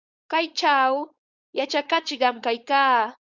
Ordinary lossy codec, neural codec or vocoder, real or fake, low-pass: Opus, 64 kbps; codec, 16 kHz, 4.8 kbps, FACodec; fake; 7.2 kHz